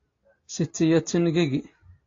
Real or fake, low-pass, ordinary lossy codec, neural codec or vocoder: real; 7.2 kHz; AAC, 32 kbps; none